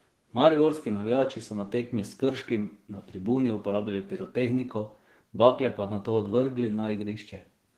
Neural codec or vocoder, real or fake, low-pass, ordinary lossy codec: codec, 32 kHz, 1.9 kbps, SNAC; fake; 14.4 kHz; Opus, 16 kbps